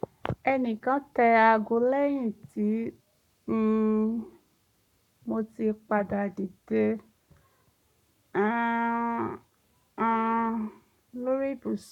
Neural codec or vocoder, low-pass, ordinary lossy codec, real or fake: codec, 44.1 kHz, 7.8 kbps, Pupu-Codec; 19.8 kHz; none; fake